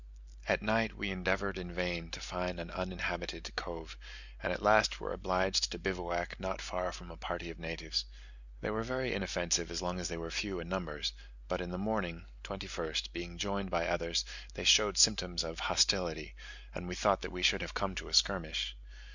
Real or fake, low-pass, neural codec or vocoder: real; 7.2 kHz; none